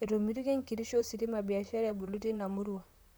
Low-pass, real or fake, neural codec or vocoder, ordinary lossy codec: none; fake; vocoder, 44.1 kHz, 128 mel bands, Pupu-Vocoder; none